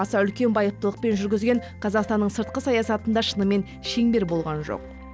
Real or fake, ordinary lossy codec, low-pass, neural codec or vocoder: real; none; none; none